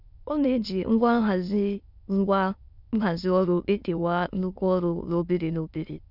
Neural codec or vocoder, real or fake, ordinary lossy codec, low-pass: autoencoder, 22.05 kHz, a latent of 192 numbers a frame, VITS, trained on many speakers; fake; none; 5.4 kHz